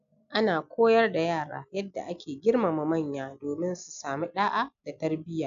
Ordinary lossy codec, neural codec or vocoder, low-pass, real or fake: none; none; 7.2 kHz; real